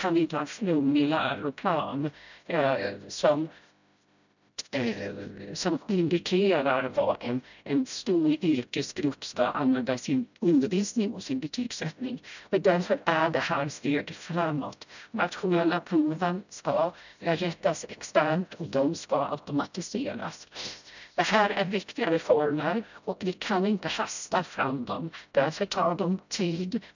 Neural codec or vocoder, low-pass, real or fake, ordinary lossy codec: codec, 16 kHz, 0.5 kbps, FreqCodec, smaller model; 7.2 kHz; fake; none